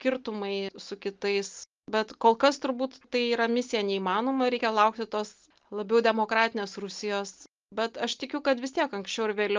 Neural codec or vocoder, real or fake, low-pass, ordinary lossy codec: none; real; 7.2 kHz; Opus, 24 kbps